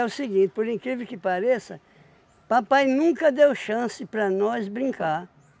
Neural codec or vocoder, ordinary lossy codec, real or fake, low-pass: none; none; real; none